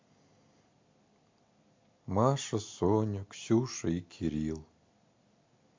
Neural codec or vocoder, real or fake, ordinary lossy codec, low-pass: none; real; MP3, 48 kbps; 7.2 kHz